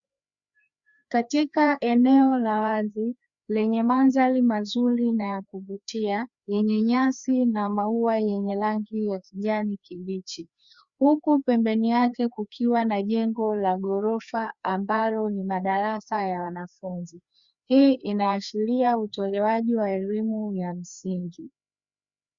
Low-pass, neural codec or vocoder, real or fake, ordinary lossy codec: 7.2 kHz; codec, 16 kHz, 2 kbps, FreqCodec, larger model; fake; Opus, 64 kbps